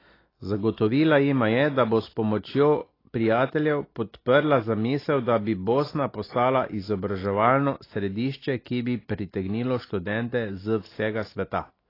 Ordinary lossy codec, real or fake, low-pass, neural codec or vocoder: AAC, 24 kbps; real; 5.4 kHz; none